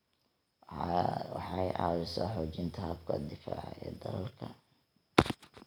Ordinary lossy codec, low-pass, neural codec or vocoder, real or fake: none; none; none; real